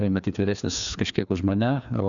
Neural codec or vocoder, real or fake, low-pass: codec, 16 kHz, 2 kbps, FreqCodec, larger model; fake; 7.2 kHz